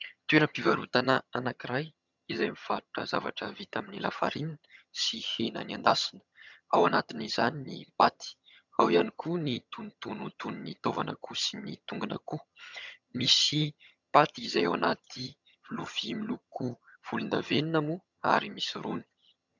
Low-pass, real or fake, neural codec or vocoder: 7.2 kHz; fake; vocoder, 22.05 kHz, 80 mel bands, HiFi-GAN